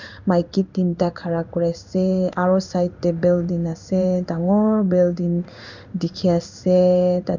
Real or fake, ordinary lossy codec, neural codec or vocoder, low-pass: fake; none; codec, 16 kHz in and 24 kHz out, 1 kbps, XY-Tokenizer; 7.2 kHz